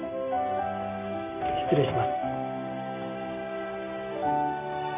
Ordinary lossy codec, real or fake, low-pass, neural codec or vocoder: MP3, 32 kbps; real; 3.6 kHz; none